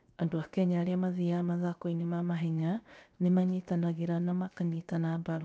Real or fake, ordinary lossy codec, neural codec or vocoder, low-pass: fake; none; codec, 16 kHz, 0.7 kbps, FocalCodec; none